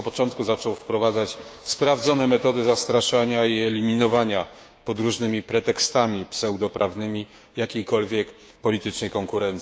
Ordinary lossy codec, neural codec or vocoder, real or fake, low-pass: none; codec, 16 kHz, 6 kbps, DAC; fake; none